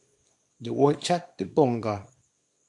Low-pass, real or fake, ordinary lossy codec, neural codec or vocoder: 10.8 kHz; fake; MP3, 64 kbps; codec, 24 kHz, 0.9 kbps, WavTokenizer, small release